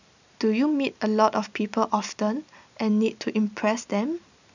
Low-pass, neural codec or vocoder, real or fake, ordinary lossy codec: 7.2 kHz; none; real; none